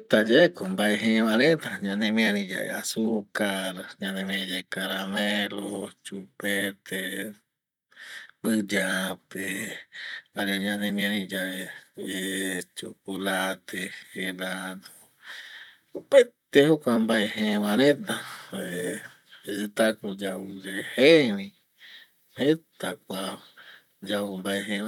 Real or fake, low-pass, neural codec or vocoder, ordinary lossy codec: fake; 19.8 kHz; vocoder, 44.1 kHz, 128 mel bands, Pupu-Vocoder; none